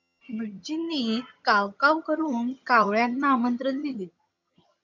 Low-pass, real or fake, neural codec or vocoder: 7.2 kHz; fake; vocoder, 22.05 kHz, 80 mel bands, HiFi-GAN